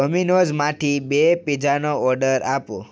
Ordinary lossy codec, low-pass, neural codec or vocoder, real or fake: none; none; none; real